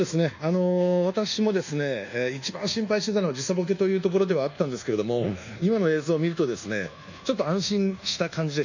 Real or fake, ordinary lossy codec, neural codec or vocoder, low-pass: fake; none; codec, 24 kHz, 1.2 kbps, DualCodec; 7.2 kHz